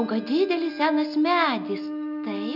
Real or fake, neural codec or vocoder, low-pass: real; none; 5.4 kHz